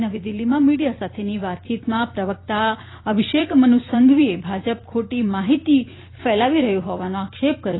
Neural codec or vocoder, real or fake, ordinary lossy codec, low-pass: vocoder, 44.1 kHz, 128 mel bands every 256 samples, BigVGAN v2; fake; AAC, 16 kbps; 7.2 kHz